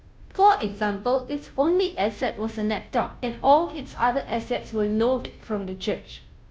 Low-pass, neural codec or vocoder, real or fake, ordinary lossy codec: none; codec, 16 kHz, 0.5 kbps, FunCodec, trained on Chinese and English, 25 frames a second; fake; none